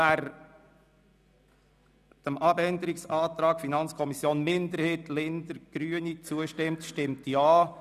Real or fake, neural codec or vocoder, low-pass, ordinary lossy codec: real; none; 14.4 kHz; none